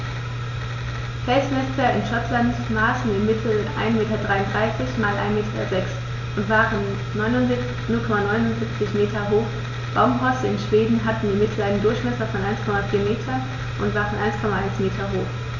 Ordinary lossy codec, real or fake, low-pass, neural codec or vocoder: none; real; 7.2 kHz; none